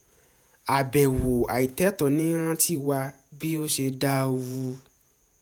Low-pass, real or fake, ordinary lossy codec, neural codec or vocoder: none; fake; none; vocoder, 48 kHz, 128 mel bands, Vocos